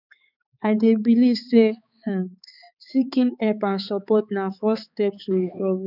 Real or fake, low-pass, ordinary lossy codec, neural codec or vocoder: fake; 5.4 kHz; none; codec, 16 kHz, 4 kbps, X-Codec, HuBERT features, trained on balanced general audio